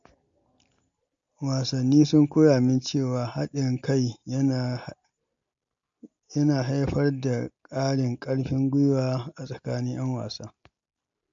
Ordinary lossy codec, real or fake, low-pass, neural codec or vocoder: MP3, 48 kbps; real; 7.2 kHz; none